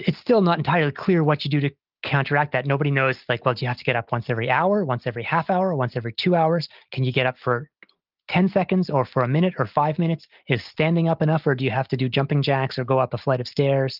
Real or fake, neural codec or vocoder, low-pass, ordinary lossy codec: real; none; 5.4 kHz; Opus, 24 kbps